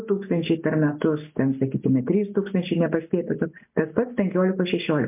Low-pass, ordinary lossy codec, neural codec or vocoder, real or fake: 3.6 kHz; MP3, 32 kbps; none; real